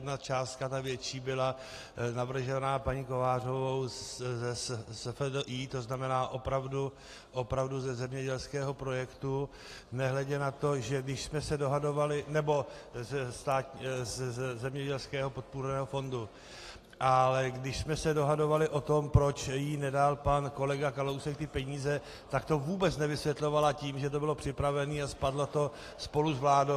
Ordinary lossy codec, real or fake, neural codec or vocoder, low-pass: AAC, 48 kbps; real; none; 14.4 kHz